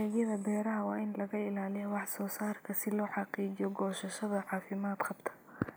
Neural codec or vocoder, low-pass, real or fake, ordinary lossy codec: none; none; real; none